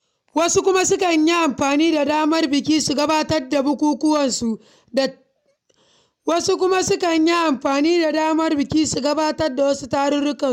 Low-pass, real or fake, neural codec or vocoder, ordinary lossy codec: 19.8 kHz; real; none; MP3, 96 kbps